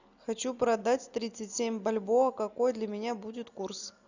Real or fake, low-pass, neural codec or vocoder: real; 7.2 kHz; none